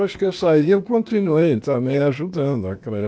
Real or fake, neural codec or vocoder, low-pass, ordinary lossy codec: fake; codec, 16 kHz, 0.8 kbps, ZipCodec; none; none